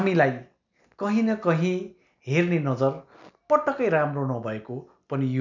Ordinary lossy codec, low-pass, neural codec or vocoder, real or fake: none; 7.2 kHz; none; real